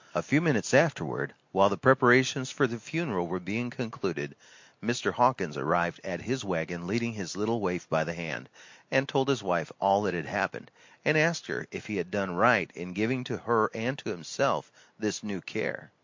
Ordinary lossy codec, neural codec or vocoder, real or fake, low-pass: MP3, 48 kbps; none; real; 7.2 kHz